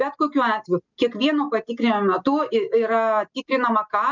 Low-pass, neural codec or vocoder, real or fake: 7.2 kHz; none; real